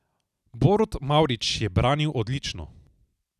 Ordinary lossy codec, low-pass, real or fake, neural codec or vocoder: none; 14.4 kHz; real; none